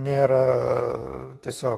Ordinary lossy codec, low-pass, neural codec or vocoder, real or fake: AAC, 32 kbps; 19.8 kHz; autoencoder, 48 kHz, 32 numbers a frame, DAC-VAE, trained on Japanese speech; fake